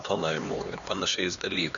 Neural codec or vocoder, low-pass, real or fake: codec, 16 kHz, 2 kbps, X-Codec, HuBERT features, trained on LibriSpeech; 7.2 kHz; fake